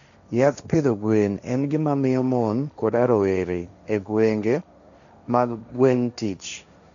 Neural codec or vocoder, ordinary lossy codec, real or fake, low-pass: codec, 16 kHz, 1.1 kbps, Voila-Tokenizer; none; fake; 7.2 kHz